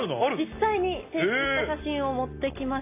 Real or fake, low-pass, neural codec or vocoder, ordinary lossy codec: real; 3.6 kHz; none; AAC, 24 kbps